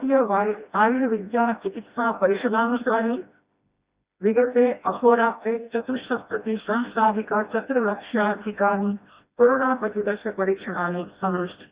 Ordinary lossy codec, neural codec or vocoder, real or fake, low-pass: Opus, 64 kbps; codec, 16 kHz, 1 kbps, FreqCodec, smaller model; fake; 3.6 kHz